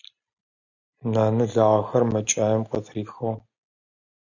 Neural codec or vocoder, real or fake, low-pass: none; real; 7.2 kHz